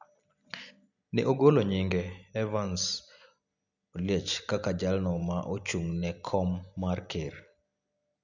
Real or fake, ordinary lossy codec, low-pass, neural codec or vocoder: real; none; 7.2 kHz; none